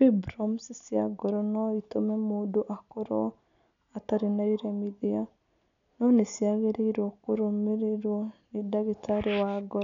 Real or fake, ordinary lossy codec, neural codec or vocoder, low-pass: real; none; none; 7.2 kHz